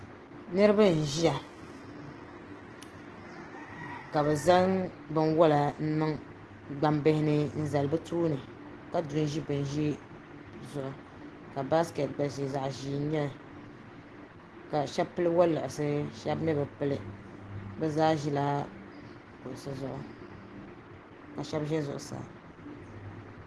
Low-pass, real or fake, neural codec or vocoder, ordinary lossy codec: 10.8 kHz; real; none; Opus, 16 kbps